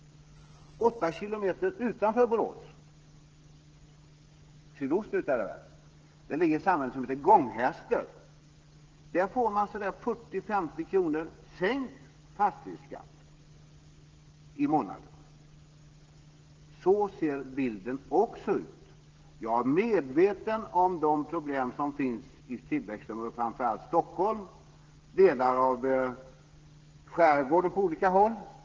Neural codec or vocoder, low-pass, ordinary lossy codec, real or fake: codec, 16 kHz, 16 kbps, FreqCodec, smaller model; 7.2 kHz; Opus, 16 kbps; fake